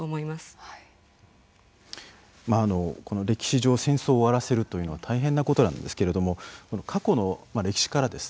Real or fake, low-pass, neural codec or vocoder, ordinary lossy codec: real; none; none; none